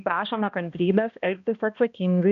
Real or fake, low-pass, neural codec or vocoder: fake; 7.2 kHz; codec, 16 kHz, 1 kbps, X-Codec, HuBERT features, trained on balanced general audio